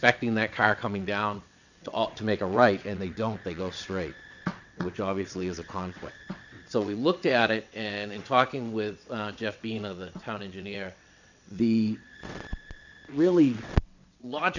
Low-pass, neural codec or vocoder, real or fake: 7.2 kHz; vocoder, 22.05 kHz, 80 mel bands, WaveNeXt; fake